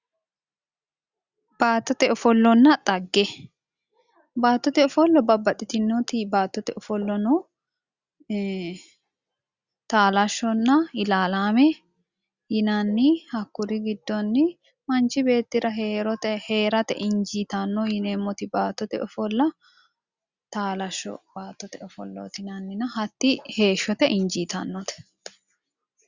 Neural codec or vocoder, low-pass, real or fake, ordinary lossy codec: none; 7.2 kHz; real; Opus, 64 kbps